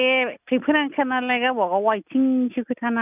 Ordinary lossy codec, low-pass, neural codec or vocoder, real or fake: none; 3.6 kHz; none; real